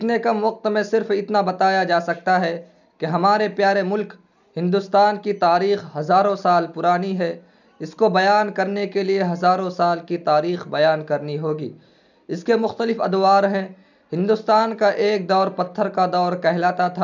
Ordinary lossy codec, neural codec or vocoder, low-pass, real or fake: none; none; 7.2 kHz; real